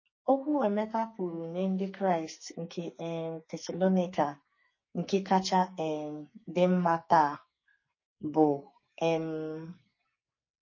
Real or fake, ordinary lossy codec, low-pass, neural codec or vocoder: fake; MP3, 32 kbps; 7.2 kHz; codec, 44.1 kHz, 2.6 kbps, SNAC